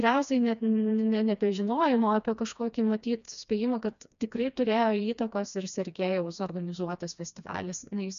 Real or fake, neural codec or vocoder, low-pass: fake; codec, 16 kHz, 2 kbps, FreqCodec, smaller model; 7.2 kHz